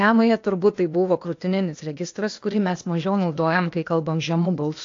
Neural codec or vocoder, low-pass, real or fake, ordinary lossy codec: codec, 16 kHz, 0.8 kbps, ZipCodec; 7.2 kHz; fake; AAC, 48 kbps